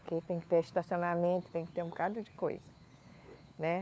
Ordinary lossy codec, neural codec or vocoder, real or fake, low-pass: none; codec, 16 kHz, 4 kbps, FunCodec, trained on LibriTTS, 50 frames a second; fake; none